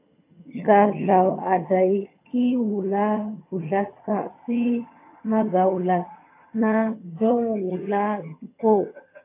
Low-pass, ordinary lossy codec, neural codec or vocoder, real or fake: 3.6 kHz; AAC, 24 kbps; vocoder, 22.05 kHz, 80 mel bands, HiFi-GAN; fake